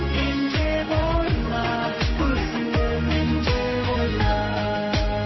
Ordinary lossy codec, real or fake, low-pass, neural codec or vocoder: MP3, 24 kbps; fake; 7.2 kHz; codec, 16 kHz in and 24 kHz out, 1 kbps, XY-Tokenizer